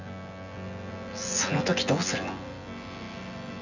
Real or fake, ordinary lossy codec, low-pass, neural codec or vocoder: fake; none; 7.2 kHz; vocoder, 24 kHz, 100 mel bands, Vocos